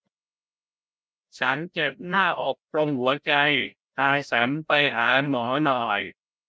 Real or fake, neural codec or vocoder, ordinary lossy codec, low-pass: fake; codec, 16 kHz, 0.5 kbps, FreqCodec, larger model; none; none